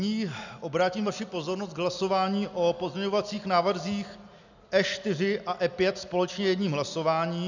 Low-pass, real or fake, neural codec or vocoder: 7.2 kHz; real; none